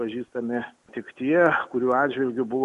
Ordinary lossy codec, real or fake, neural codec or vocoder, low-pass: MP3, 48 kbps; real; none; 14.4 kHz